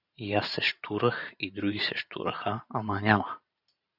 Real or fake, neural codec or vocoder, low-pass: fake; vocoder, 44.1 kHz, 128 mel bands every 512 samples, BigVGAN v2; 5.4 kHz